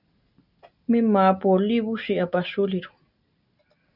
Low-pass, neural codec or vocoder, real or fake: 5.4 kHz; none; real